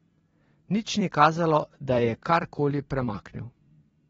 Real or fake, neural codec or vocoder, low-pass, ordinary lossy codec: fake; vocoder, 44.1 kHz, 128 mel bands every 256 samples, BigVGAN v2; 19.8 kHz; AAC, 24 kbps